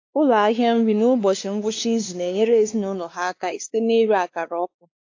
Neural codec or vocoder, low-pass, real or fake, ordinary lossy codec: codec, 16 kHz, 2 kbps, X-Codec, WavLM features, trained on Multilingual LibriSpeech; 7.2 kHz; fake; AAC, 48 kbps